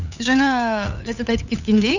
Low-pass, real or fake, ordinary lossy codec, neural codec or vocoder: 7.2 kHz; fake; none; codec, 16 kHz, 8 kbps, FunCodec, trained on LibriTTS, 25 frames a second